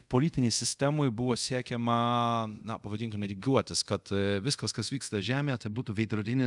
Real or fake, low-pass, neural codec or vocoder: fake; 10.8 kHz; codec, 24 kHz, 0.5 kbps, DualCodec